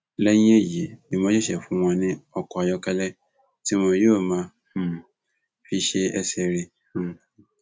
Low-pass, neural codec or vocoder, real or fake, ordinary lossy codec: none; none; real; none